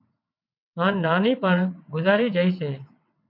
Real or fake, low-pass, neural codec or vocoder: fake; 5.4 kHz; vocoder, 22.05 kHz, 80 mel bands, WaveNeXt